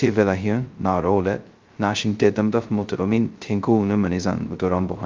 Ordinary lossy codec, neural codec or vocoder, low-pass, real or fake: Opus, 32 kbps; codec, 16 kHz, 0.2 kbps, FocalCodec; 7.2 kHz; fake